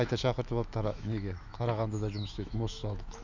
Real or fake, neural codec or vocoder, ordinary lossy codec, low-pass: fake; autoencoder, 48 kHz, 128 numbers a frame, DAC-VAE, trained on Japanese speech; none; 7.2 kHz